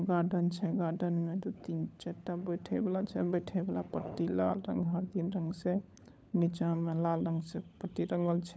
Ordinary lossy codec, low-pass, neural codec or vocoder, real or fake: none; none; codec, 16 kHz, 16 kbps, FunCodec, trained on LibriTTS, 50 frames a second; fake